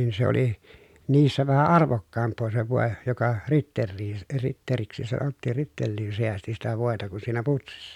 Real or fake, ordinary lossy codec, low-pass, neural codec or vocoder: real; none; 19.8 kHz; none